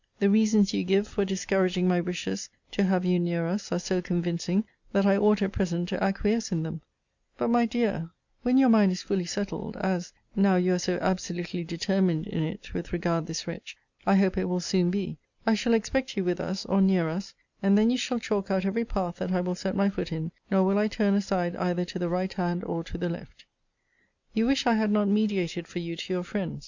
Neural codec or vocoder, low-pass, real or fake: none; 7.2 kHz; real